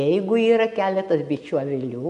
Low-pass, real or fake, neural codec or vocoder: 10.8 kHz; real; none